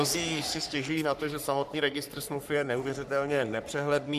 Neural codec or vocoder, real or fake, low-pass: codec, 44.1 kHz, 3.4 kbps, Pupu-Codec; fake; 14.4 kHz